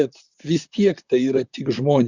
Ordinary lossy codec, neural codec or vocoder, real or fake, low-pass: Opus, 64 kbps; vocoder, 44.1 kHz, 128 mel bands, Pupu-Vocoder; fake; 7.2 kHz